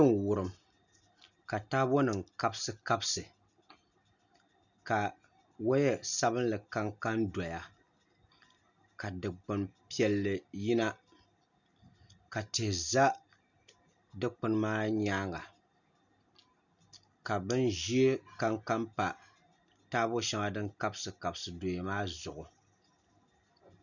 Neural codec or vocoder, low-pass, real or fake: none; 7.2 kHz; real